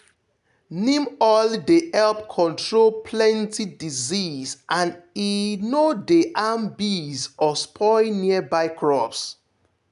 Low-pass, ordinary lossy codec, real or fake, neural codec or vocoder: 10.8 kHz; none; real; none